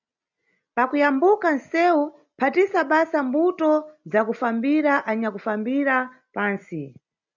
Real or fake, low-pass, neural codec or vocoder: real; 7.2 kHz; none